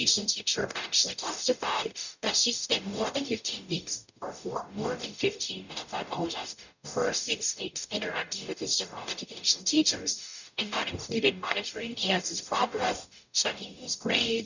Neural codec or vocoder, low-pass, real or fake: codec, 44.1 kHz, 0.9 kbps, DAC; 7.2 kHz; fake